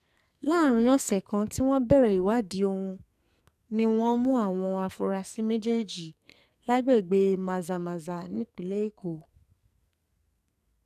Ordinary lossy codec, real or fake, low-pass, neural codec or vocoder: none; fake; 14.4 kHz; codec, 44.1 kHz, 2.6 kbps, SNAC